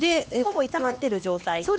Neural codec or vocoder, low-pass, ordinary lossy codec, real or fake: codec, 16 kHz, 2 kbps, X-Codec, HuBERT features, trained on LibriSpeech; none; none; fake